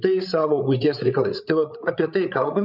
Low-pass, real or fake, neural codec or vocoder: 5.4 kHz; fake; codec, 16 kHz, 8 kbps, FreqCodec, larger model